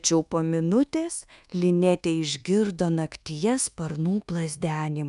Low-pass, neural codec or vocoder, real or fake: 10.8 kHz; codec, 24 kHz, 1.2 kbps, DualCodec; fake